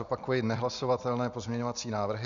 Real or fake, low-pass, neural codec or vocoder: real; 7.2 kHz; none